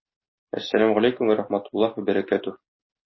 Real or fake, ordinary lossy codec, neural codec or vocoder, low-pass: real; MP3, 24 kbps; none; 7.2 kHz